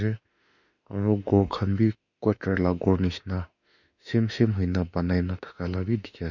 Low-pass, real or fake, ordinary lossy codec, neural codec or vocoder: 7.2 kHz; fake; none; autoencoder, 48 kHz, 32 numbers a frame, DAC-VAE, trained on Japanese speech